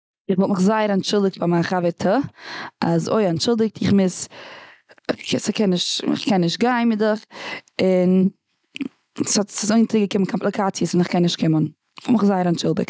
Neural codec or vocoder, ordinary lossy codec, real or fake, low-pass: none; none; real; none